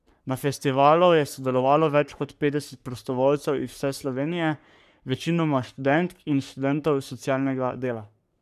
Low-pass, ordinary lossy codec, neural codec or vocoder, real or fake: 14.4 kHz; none; codec, 44.1 kHz, 3.4 kbps, Pupu-Codec; fake